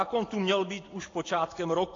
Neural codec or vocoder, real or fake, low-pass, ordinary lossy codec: none; real; 7.2 kHz; AAC, 32 kbps